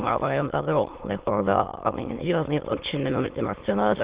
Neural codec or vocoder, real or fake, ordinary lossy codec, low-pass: autoencoder, 22.05 kHz, a latent of 192 numbers a frame, VITS, trained on many speakers; fake; Opus, 16 kbps; 3.6 kHz